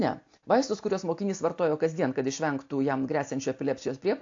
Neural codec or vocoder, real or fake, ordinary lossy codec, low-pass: none; real; AAC, 48 kbps; 7.2 kHz